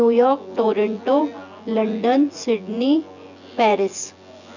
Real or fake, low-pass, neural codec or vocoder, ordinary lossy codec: fake; 7.2 kHz; vocoder, 24 kHz, 100 mel bands, Vocos; none